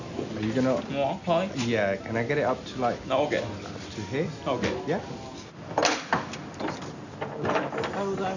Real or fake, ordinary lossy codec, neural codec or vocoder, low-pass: real; none; none; 7.2 kHz